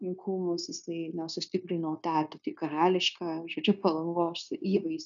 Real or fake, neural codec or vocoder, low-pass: fake; codec, 16 kHz, 0.9 kbps, LongCat-Audio-Codec; 7.2 kHz